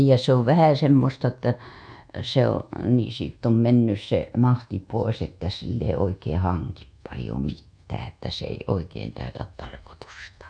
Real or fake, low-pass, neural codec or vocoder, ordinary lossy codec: fake; 9.9 kHz; codec, 24 kHz, 1.2 kbps, DualCodec; none